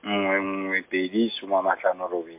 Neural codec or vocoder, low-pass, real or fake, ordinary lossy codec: none; 3.6 kHz; real; MP3, 24 kbps